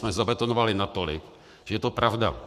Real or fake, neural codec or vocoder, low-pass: fake; codec, 44.1 kHz, 7.8 kbps, Pupu-Codec; 14.4 kHz